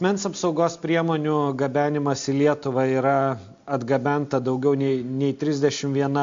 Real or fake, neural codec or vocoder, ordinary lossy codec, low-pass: real; none; MP3, 48 kbps; 7.2 kHz